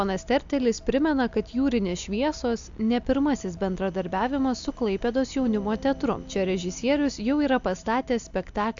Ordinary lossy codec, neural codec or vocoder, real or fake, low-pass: AAC, 64 kbps; none; real; 7.2 kHz